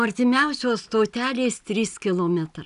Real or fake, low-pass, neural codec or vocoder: real; 10.8 kHz; none